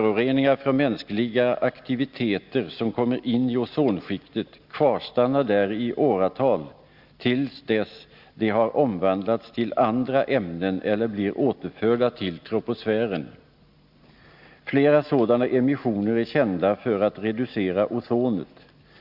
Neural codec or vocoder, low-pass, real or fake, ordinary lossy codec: none; 5.4 kHz; real; Opus, 64 kbps